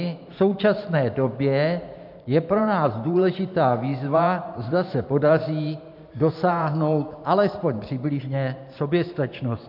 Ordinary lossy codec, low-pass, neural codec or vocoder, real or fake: MP3, 48 kbps; 5.4 kHz; vocoder, 44.1 kHz, 128 mel bands every 512 samples, BigVGAN v2; fake